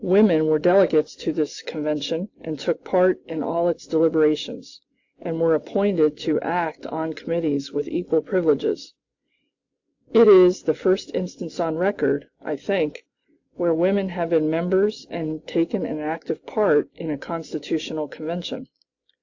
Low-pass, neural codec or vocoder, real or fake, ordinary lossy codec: 7.2 kHz; none; real; AAC, 48 kbps